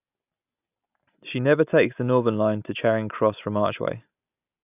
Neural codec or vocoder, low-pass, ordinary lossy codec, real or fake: none; 3.6 kHz; none; real